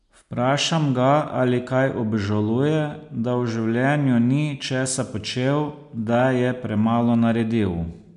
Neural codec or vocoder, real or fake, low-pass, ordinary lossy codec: none; real; 10.8 kHz; MP3, 64 kbps